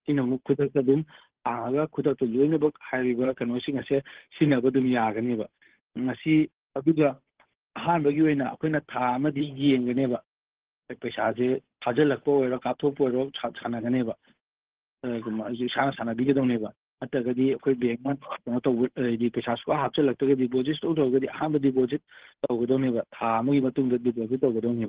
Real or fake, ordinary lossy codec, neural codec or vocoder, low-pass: fake; Opus, 16 kbps; codec, 16 kHz, 8 kbps, FunCodec, trained on Chinese and English, 25 frames a second; 3.6 kHz